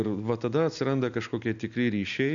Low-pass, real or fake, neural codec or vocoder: 7.2 kHz; real; none